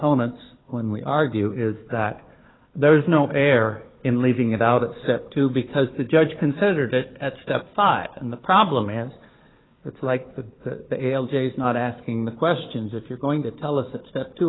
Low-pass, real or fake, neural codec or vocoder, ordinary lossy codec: 7.2 kHz; fake; codec, 16 kHz, 4 kbps, FunCodec, trained on Chinese and English, 50 frames a second; AAC, 16 kbps